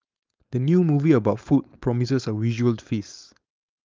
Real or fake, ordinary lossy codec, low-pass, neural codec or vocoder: fake; Opus, 24 kbps; 7.2 kHz; codec, 16 kHz, 4.8 kbps, FACodec